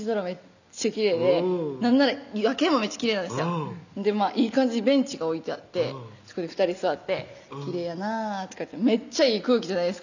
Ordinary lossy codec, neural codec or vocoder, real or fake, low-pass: none; none; real; 7.2 kHz